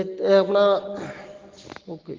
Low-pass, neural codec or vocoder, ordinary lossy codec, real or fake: 7.2 kHz; none; Opus, 16 kbps; real